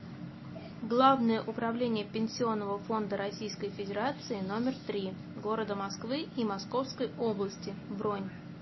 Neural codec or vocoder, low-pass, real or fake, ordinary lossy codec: none; 7.2 kHz; real; MP3, 24 kbps